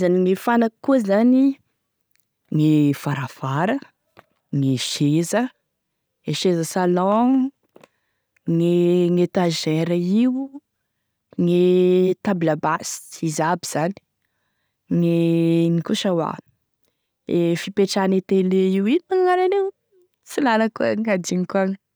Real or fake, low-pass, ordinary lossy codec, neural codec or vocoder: real; none; none; none